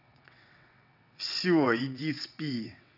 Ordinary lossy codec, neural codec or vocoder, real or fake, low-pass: none; vocoder, 22.05 kHz, 80 mel bands, WaveNeXt; fake; 5.4 kHz